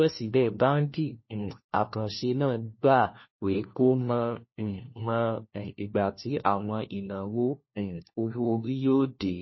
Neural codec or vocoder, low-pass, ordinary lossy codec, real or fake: codec, 16 kHz, 1 kbps, FunCodec, trained on LibriTTS, 50 frames a second; 7.2 kHz; MP3, 24 kbps; fake